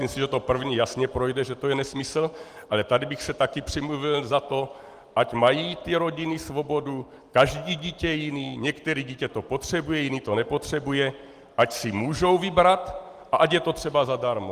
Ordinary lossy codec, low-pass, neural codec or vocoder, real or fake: Opus, 24 kbps; 14.4 kHz; none; real